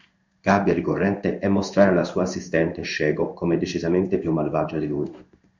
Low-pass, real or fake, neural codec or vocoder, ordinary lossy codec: 7.2 kHz; fake; codec, 16 kHz in and 24 kHz out, 1 kbps, XY-Tokenizer; Opus, 64 kbps